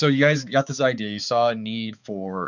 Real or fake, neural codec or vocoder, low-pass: fake; codec, 16 kHz, 4 kbps, X-Codec, HuBERT features, trained on balanced general audio; 7.2 kHz